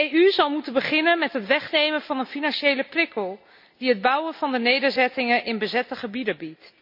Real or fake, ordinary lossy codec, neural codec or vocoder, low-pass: real; MP3, 48 kbps; none; 5.4 kHz